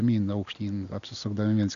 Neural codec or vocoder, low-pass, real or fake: none; 7.2 kHz; real